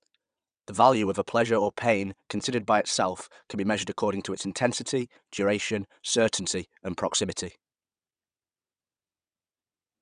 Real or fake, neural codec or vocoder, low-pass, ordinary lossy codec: fake; vocoder, 22.05 kHz, 80 mel bands, WaveNeXt; 9.9 kHz; none